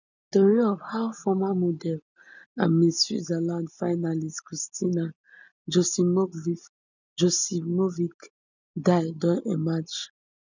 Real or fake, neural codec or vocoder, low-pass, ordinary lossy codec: real; none; 7.2 kHz; none